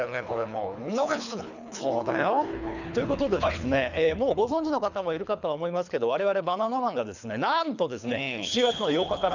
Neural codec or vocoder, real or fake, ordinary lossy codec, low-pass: codec, 24 kHz, 3 kbps, HILCodec; fake; none; 7.2 kHz